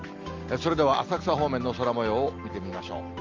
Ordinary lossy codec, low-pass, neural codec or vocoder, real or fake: Opus, 32 kbps; 7.2 kHz; none; real